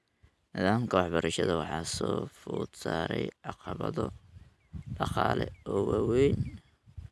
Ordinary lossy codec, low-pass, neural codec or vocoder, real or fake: none; none; none; real